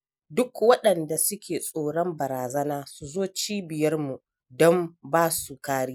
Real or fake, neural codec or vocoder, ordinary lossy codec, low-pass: real; none; none; none